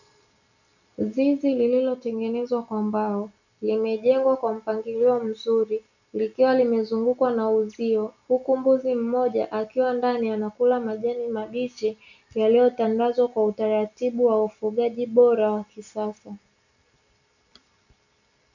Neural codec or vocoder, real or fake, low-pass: none; real; 7.2 kHz